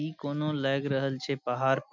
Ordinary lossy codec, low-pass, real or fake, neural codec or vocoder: none; 7.2 kHz; real; none